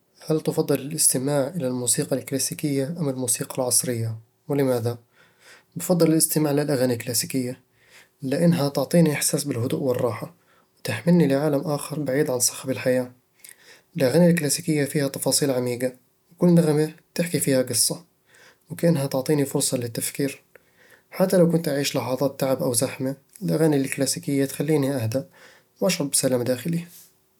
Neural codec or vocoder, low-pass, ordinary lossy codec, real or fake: none; 19.8 kHz; none; real